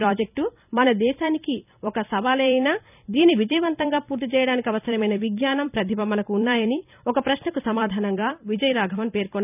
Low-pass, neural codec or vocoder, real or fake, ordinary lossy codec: 3.6 kHz; vocoder, 44.1 kHz, 128 mel bands every 256 samples, BigVGAN v2; fake; none